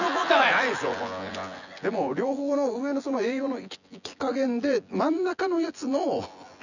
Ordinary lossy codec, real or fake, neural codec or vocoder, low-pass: none; fake; vocoder, 24 kHz, 100 mel bands, Vocos; 7.2 kHz